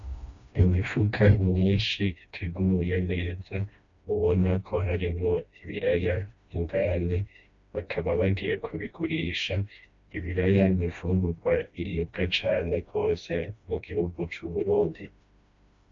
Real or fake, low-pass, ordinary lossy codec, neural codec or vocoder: fake; 7.2 kHz; MP3, 64 kbps; codec, 16 kHz, 1 kbps, FreqCodec, smaller model